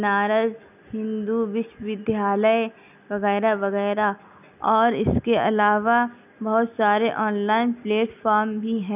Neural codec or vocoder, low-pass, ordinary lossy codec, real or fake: none; 3.6 kHz; none; real